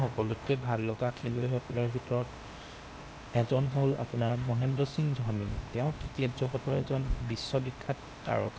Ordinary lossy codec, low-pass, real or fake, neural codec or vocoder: none; none; fake; codec, 16 kHz, 0.8 kbps, ZipCodec